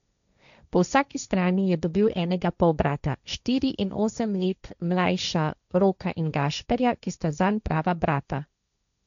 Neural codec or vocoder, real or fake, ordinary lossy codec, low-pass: codec, 16 kHz, 1.1 kbps, Voila-Tokenizer; fake; none; 7.2 kHz